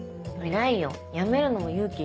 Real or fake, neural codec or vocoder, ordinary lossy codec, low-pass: real; none; none; none